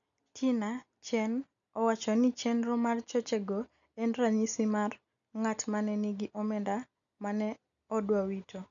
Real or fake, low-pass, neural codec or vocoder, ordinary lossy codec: real; 7.2 kHz; none; none